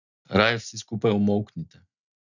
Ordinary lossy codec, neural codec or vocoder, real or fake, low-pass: none; none; real; 7.2 kHz